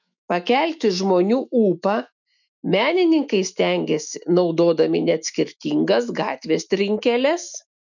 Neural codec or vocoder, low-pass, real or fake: autoencoder, 48 kHz, 128 numbers a frame, DAC-VAE, trained on Japanese speech; 7.2 kHz; fake